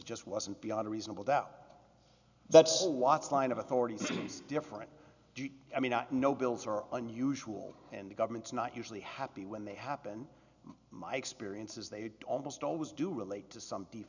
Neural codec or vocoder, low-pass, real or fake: none; 7.2 kHz; real